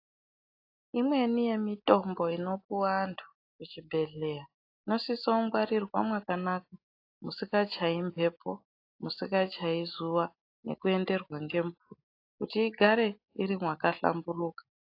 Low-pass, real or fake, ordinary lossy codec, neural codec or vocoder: 5.4 kHz; real; AAC, 32 kbps; none